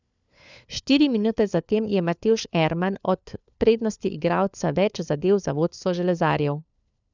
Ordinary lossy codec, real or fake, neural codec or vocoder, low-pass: none; fake; codec, 16 kHz, 4 kbps, FunCodec, trained on LibriTTS, 50 frames a second; 7.2 kHz